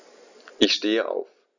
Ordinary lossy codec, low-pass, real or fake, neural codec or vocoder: none; 7.2 kHz; real; none